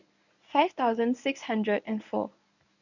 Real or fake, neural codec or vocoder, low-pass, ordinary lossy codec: fake; codec, 24 kHz, 0.9 kbps, WavTokenizer, medium speech release version 1; 7.2 kHz; none